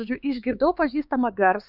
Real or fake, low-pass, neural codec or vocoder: fake; 5.4 kHz; codec, 16 kHz, 4 kbps, X-Codec, HuBERT features, trained on LibriSpeech